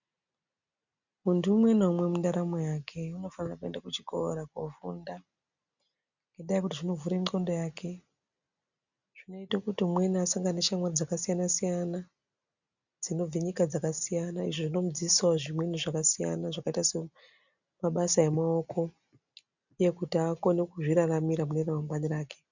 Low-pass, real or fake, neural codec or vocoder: 7.2 kHz; real; none